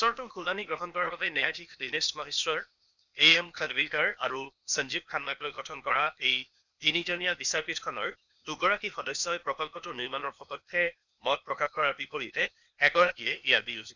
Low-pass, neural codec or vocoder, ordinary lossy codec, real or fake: 7.2 kHz; codec, 16 kHz, 0.8 kbps, ZipCodec; none; fake